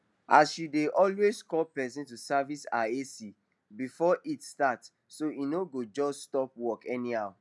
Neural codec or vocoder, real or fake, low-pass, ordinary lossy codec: none; real; none; none